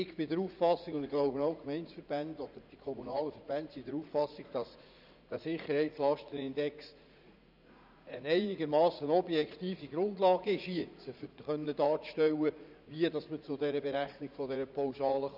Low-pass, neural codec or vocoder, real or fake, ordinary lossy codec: 5.4 kHz; vocoder, 44.1 kHz, 80 mel bands, Vocos; fake; none